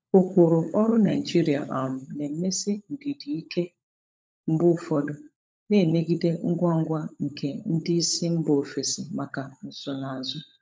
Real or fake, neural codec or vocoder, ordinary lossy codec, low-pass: fake; codec, 16 kHz, 16 kbps, FunCodec, trained on LibriTTS, 50 frames a second; none; none